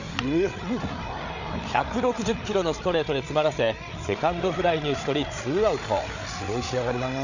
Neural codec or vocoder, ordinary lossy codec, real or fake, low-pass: codec, 16 kHz, 8 kbps, FreqCodec, larger model; none; fake; 7.2 kHz